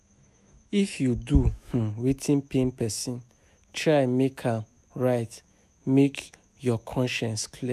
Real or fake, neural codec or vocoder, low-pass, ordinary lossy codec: fake; autoencoder, 48 kHz, 128 numbers a frame, DAC-VAE, trained on Japanese speech; 14.4 kHz; none